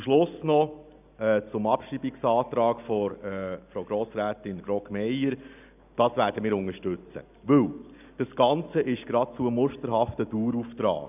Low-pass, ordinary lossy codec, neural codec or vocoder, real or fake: 3.6 kHz; none; none; real